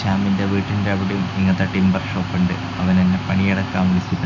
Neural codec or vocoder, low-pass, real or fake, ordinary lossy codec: none; 7.2 kHz; real; none